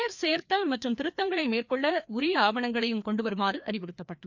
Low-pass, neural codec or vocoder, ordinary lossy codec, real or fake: 7.2 kHz; codec, 16 kHz, 2 kbps, FreqCodec, larger model; none; fake